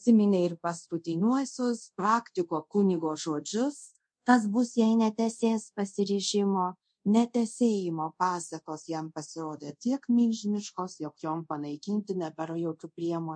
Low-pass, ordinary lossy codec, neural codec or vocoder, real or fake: 9.9 kHz; MP3, 48 kbps; codec, 24 kHz, 0.5 kbps, DualCodec; fake